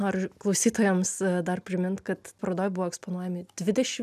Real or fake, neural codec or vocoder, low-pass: real; none; 14.4 kHz